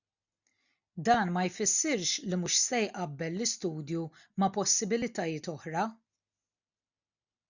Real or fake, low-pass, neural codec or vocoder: real; 7.2 kHz; none